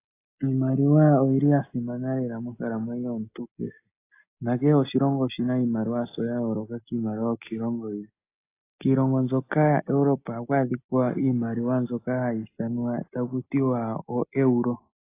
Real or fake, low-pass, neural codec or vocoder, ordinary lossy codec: real; 3.6 kHz; none; AAC, 24 kbps